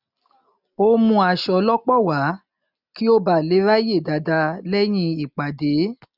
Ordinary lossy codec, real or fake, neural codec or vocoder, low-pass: none; real; none; 5.4 kHz